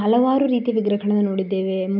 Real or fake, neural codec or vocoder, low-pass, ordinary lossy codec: real; none; 5.4 kHz; none